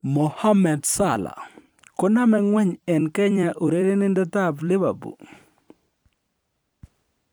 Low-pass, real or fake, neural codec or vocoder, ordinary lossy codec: none; fake; vocoder, 44.1 kHz, 128 mel bands every 256 samples, BigVGAN v2; none